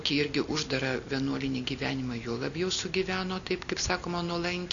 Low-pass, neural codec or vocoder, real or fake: 7.2 kHz; none; real